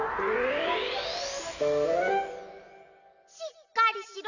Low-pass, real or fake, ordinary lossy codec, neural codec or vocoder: 7.2 kHz; real; none; none